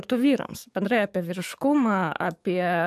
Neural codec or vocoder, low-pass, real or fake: codec, 44.1 kHz, 7.8 kbps, DAC; 14.4 kHz; fake